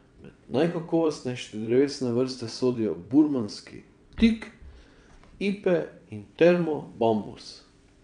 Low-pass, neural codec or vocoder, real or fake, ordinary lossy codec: 9.9 kHz; vocoder, 22.05 kHz, 80 mel bands, WaveNeXt; fake; none